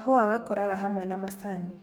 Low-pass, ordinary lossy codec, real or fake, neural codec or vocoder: none; none; fake; codec, 44.1 kHz, 2.6 kbps, DAC